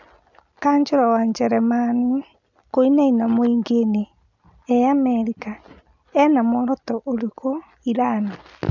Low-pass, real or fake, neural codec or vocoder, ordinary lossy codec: 7.2 kHz; real; none; none